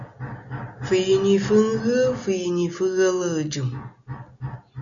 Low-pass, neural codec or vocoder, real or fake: 7.2 kHz; none; real